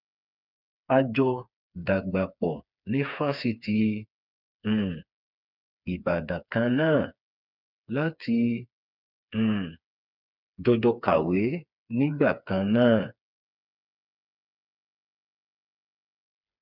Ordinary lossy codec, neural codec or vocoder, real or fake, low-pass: none; codec, 16 kHz, 4 kbps, FreqCodec, smaller model; fake; 5.4 kHz